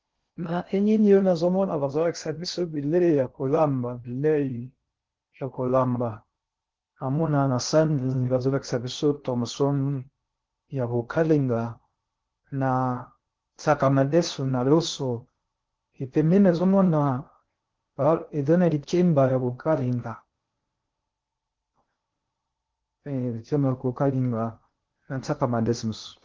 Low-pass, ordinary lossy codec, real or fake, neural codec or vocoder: 7.2 kHz; Opus, 16 kbps; fake; codec, 16 kHz in and 24 kHz out, 0.6 kbps, FocalCodec, streaming, 2048 codes